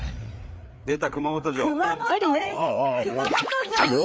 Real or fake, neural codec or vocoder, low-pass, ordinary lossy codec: fake; codec, 16 kHz, 4 kbps, FreqCodec, larger model; none; none